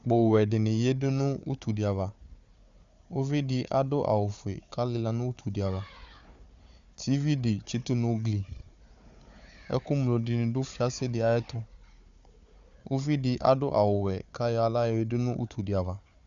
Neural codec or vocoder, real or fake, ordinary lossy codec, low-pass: codec, 16 kHz, 4 kbps, FunCodec, trained on Chinese and English, 50 frames a second; fake; Opus, 64 kbps; 7.2 kHz